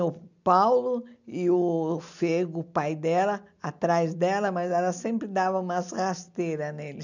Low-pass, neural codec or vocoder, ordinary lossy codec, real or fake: 7.2 kHz; none; none; real